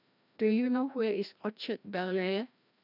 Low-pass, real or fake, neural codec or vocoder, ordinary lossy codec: 5.4 kHz; fake; codec, 16 kHz, 1 kbps, FreqCodec, larger model; none